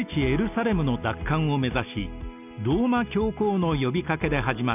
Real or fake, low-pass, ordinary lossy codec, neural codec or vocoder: real; 3.6 kHz; none; none